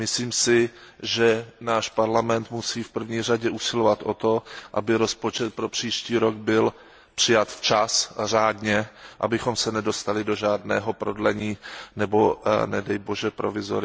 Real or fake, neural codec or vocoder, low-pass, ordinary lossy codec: real; none; none; none